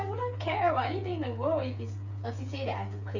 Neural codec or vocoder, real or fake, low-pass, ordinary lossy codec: codec, 16 kHz, 8 kbps, FreqCodec, smaller model; fake; 7.2 kHz; none